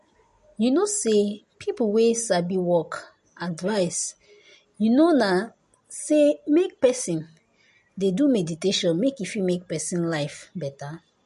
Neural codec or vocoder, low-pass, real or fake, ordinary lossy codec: none; 14.4 kHz; real; MP3, 48 kbps